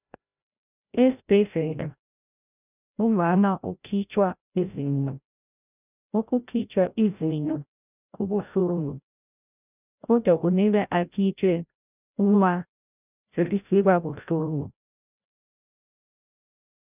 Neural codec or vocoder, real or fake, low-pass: codec, 16 kHz, 0.5 kbps, FreqCodec, larger model; fake; 3.6 kHz